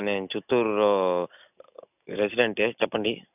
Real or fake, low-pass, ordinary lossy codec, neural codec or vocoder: real; 3.6 kHz; none; none